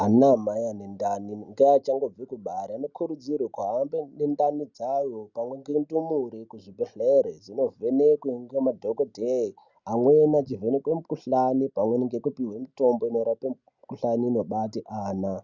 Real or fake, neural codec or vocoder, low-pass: real; none; 7.2 kHz